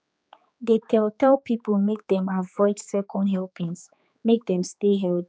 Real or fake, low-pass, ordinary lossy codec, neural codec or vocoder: fake; none; none; codec, 16 kHz, 4 kbps, X-Codec, HuBERT features, trained on general audio